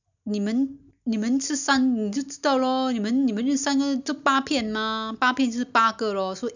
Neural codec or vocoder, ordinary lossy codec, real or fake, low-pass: none; none; real; 7.2 kHz